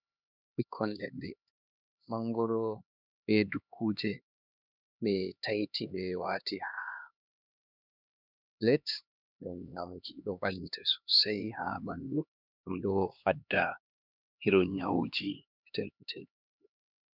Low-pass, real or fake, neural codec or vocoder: 5.4 kHz; fake; codec, 16 kHz, 2 kbps, X-Codec, HuBERT features, trained on LibriSpeech